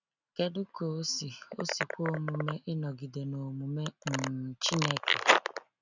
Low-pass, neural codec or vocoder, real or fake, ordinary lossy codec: 7.2 kHz; none; real; none